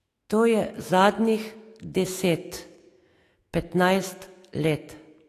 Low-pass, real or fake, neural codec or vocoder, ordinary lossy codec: 14.4 kHz; fake; autoencoder, 48 kHz, 128 numbers a frame, DAC-VAE, trained on Japanese speech; AAC, 48 kbps